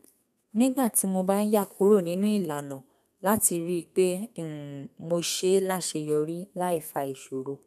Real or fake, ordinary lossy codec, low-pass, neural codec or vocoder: fake; none; 14.4 kHz; codec, 32 kHz, 1.9 kbps, SNAC